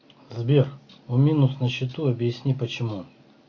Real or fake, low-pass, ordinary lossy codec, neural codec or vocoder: real; 7.2 kHz; AAC, 48 kbps; none